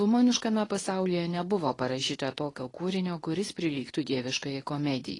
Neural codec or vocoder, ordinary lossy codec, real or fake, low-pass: codec, 24 kHz, 0.9 kbps, WavTokenizer, medium speech release version 2; AAC, 32 kbps; fake; 10.8 kHz